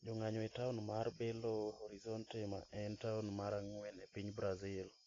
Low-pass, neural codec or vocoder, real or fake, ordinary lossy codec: 7.2 kHz; none; real; AAC, 32 kbps